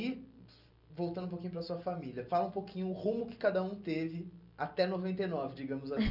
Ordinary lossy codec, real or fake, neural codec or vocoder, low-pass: none; real; none; 5.4 kHz